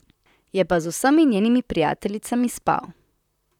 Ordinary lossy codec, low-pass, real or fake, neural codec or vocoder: none; 19.8 kHz; fake; vocoder, 44.1 kHz, 128 mel bands, Pupu-Vocoder